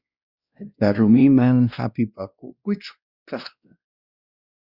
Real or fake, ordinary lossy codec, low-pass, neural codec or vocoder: fake; Opus, 64 kbps; 5.4 kHz; codec, 16 kHz, 1 kbps, X-Codec, WavLM features, trained on Multilingual LibriSpeech